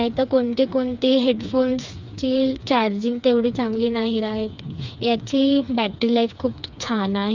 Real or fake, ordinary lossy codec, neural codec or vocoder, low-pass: fake; Opus, 64 kbps; codec, 16 kHz, 4 kbps, FreqCodec, smaller model; 7.2 kHz